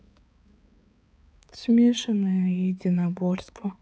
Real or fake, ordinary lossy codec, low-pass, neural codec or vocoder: fake; none; none; codec, 16 kHz, 4 kbps, X-Codec, HuBERT features, trained on balanced general audio